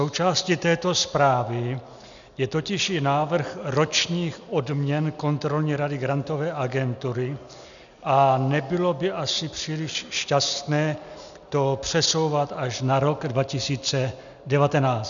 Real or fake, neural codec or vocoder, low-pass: real; none; 7.2 kHz